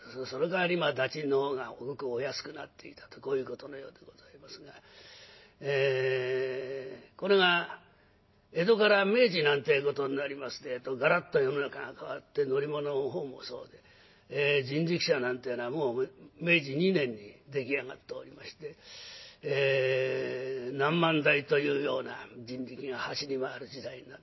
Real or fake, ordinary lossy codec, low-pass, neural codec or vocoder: real; MP3, 24 kbps; 7.2 kHz; none